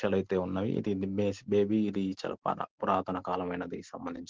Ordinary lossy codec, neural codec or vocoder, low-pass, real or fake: Opus, 16 kbps; none; 7.2 kHz; real